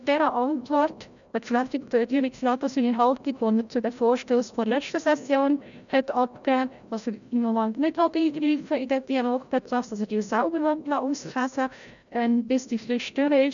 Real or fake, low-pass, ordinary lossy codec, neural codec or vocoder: fake; 7.2 kHz; none; codec, 16 kHz, 0.5 kbps, FreqCodec, larger model